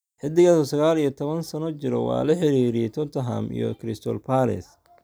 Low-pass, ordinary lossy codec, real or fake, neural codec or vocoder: none; none; real; none